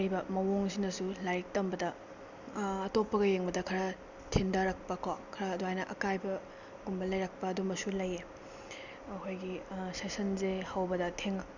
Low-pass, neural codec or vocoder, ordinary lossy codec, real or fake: 7.2 kHz; none; none; real